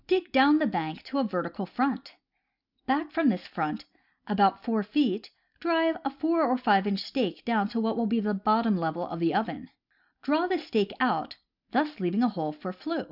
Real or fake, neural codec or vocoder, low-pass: real; none; 5.4 kHz